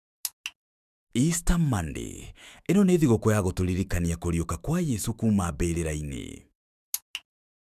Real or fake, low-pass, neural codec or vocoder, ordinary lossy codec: fake; 14.4 kHz; autoencoder, 48 kHz, 128 numbers a frame, DAC-VAE, trained on Japanese speech; none